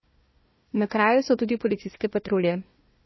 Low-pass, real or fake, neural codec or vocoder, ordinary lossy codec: 7.2 kHz; fake; codec, 16 kHz, 1 kbps, FunCodec, trained on Chinese and English, 50 frames a second; MP3, 24 kbps